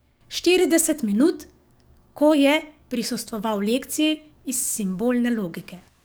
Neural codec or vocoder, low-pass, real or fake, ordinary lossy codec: codec, 44.1 kHz, 7.8 kbps, DAC; none; fake; none